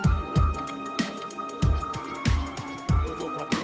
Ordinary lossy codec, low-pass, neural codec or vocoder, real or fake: none; none; codec, 16 kHz, 8 kbps, FunCodec, trained on Chinese and English, 25 frames a second; fake